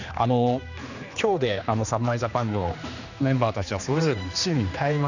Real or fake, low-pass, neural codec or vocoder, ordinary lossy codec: fake; 7.2 kHz; codec, 16 kHz, 2 kbps, X-Codec, HuBERT features, trained on general audio; none